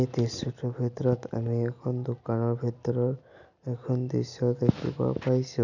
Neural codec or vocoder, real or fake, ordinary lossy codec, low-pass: none; real; none; 7.2 kHz